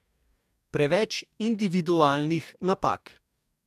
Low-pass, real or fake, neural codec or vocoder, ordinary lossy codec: 14.4 kHz; fake; codec, 44.1 kHz, 2.6 kbps, DAC; MP3, 96 kbps